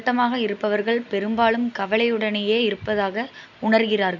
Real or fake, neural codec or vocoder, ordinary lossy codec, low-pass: real; none; none; 7.2 kHz